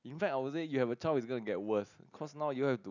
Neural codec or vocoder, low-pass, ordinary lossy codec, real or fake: none; 7.2 kHz; none; real